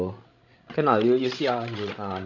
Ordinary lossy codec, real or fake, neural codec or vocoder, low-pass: none; fake; codec, 16 kHz, 16 kbps, FreqCodec, larger model; 7.2 kHz